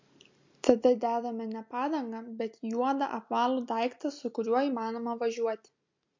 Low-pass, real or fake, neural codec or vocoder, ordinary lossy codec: 7.2 kHz; real; none; MP3, 48 kbps